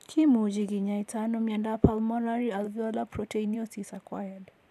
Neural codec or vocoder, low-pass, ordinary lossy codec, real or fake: vocoder, 44.1 kHz, 128 mel bands every 512 samples, BigVGAN v2; 14.4 kHz; none; fake